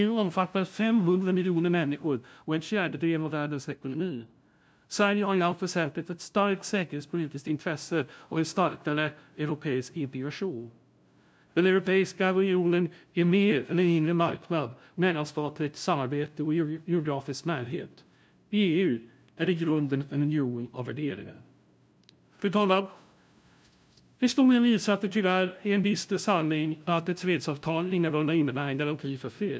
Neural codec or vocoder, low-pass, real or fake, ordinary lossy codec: codec, 16 kHz, 0.5 kbps, FunCodec, trained on LibriTTS, 25 frames a second; none; fake; none